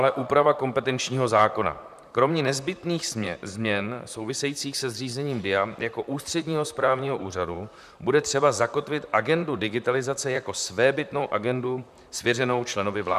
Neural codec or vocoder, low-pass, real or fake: vocoder, 44.1 kHz, 128 mel bands, Pupu-Vocoder; 14.4 kHz; fake